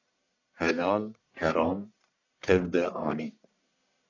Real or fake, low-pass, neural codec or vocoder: fake; 7.2 kHz; codec, 44.1 kHz, 1.7 kbps, Pupu-Codec